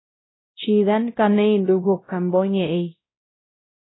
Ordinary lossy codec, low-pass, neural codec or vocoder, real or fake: AAC, 16 kbps; 7.2 kHz; codec, 16 kHz, 0.5 kbps, X-Codec, WavLM features, trained on Multilingual LibriSpeech; fake